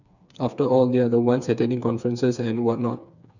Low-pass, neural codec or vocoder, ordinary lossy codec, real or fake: 7.2 kHz; codec, 16 kHz, 4 kbps, FreqCodec, smaller model; none; fake